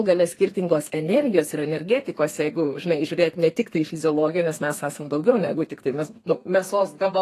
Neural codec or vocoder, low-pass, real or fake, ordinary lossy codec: codec, 32 kHz, 1.9 kbps, SNAC; 14.4 kHz; fake; AAC, 48 kbps